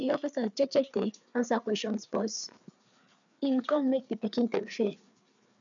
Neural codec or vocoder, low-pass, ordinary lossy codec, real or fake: codec, 16 kHz, 4 kbps, FreqCodec, larger model; 7.2 kHz; none; fake